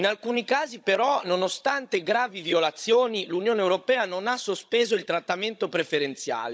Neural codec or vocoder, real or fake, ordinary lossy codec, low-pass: codec, 16 kHz, 16 kbps, FunCodec, trained on Chinese and English, 50 frames a second; fake; none; none